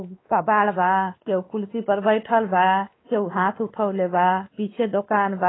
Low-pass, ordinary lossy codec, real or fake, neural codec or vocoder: 7.2 kHz; AAC, 16 kbps; fake; codec, 16 kHz, 2 kbps, X-Codec, WavLM features, trained on Multilingual LibriSpeech